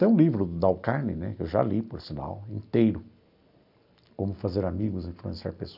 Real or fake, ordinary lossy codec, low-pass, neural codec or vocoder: real; none; 5.4 kHz; none